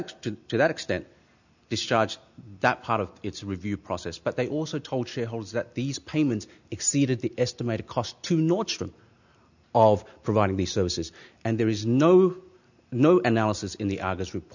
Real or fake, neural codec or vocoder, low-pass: real; none; 7.2 kHz